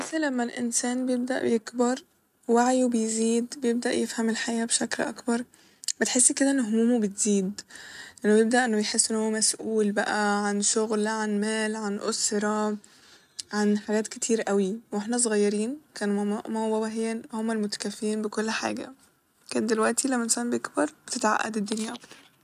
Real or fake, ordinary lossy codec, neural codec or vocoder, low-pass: real; none; none; 14.4 kHz